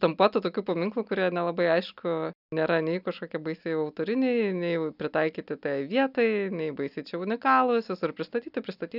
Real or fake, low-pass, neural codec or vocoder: real; 5.4 kHz; none